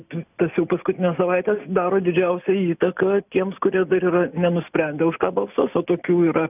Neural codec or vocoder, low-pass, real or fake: none; 3.6 kHz; real